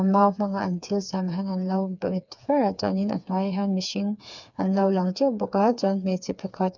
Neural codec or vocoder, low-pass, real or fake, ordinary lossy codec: codec, 16 kHz, 4 kbps, FreqCodec, smaller model; 7.2 kHz; fake; none